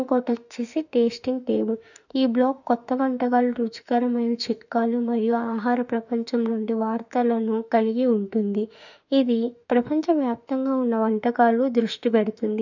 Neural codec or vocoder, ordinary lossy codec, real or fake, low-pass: autoencoder, 48 kHz, 32 numbers a frame, DAC-VAE, trained on Japanese speech; none; fake; 7.2 kHz